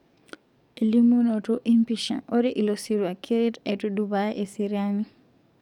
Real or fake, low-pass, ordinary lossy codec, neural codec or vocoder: fake; 19.8 kHz; none; codec, 44.1 kHz, 7.8 kbps, DAC